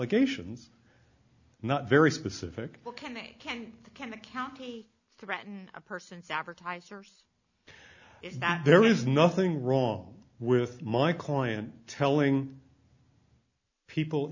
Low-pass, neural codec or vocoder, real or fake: 7.2 kHz; none; real